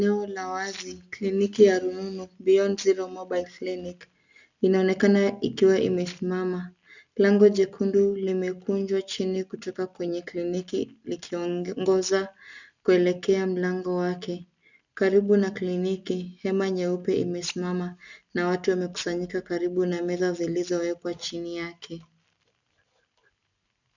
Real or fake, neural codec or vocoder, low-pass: real; none; 7.2 kHz